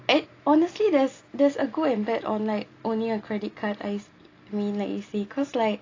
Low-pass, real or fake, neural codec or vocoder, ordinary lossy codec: 7.2 kHz; real; none; AAC, 32 kbps